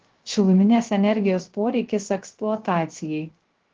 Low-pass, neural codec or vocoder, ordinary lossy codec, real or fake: 7.2 kHz; codec, 16 kHz, 0.3 kbps, FocalCodec; Opus, 16 kbps; fake